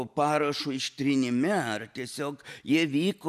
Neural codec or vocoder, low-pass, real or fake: none; 14.4 kHz; real